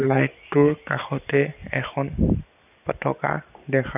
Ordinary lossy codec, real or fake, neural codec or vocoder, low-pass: none; real; none; 3.6 kHz